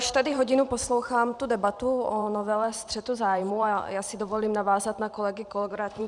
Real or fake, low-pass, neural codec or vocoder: fake; 10.8 kHz; vocoder, 44.1 kHz, 128 mel bands every 512 samples, BigVGAN v2